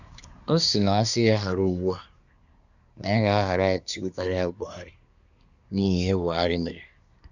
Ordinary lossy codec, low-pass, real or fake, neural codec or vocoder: none; 7.2 kHz; fake; codec, 24 kHz, 1 kbps, SNAC